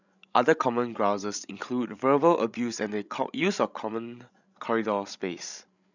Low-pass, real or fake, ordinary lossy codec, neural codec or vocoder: 7.2 kHz; fake; none; codec, 16 kHz, 16 kbps, FreqCodec, larger model